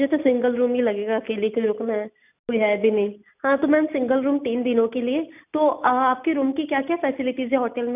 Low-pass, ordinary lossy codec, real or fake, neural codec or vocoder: 3.6 kHz; none; real; none